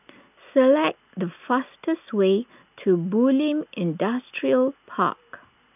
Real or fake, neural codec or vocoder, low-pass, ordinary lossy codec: real; none; 3.6 kHz; none